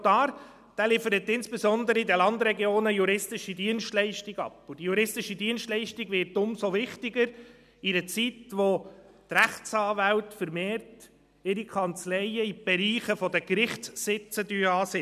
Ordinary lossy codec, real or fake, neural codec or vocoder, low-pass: none; real; none; 14.4 kHz